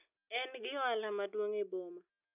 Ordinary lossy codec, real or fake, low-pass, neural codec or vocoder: none; real; 3.6 kHz; none